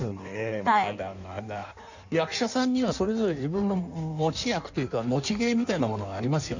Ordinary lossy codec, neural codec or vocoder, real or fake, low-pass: none; codec, 16 kHz in and 24 kHz out, 1.1 kbps, FireRedTTS-2 codec; fake; 7.2 kHz